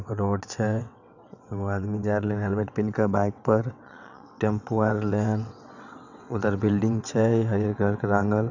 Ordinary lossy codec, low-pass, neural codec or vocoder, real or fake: none; 7.2 kHz; vocoder, 22.05 kHz, 80 mel bands, WaveNeXt; fake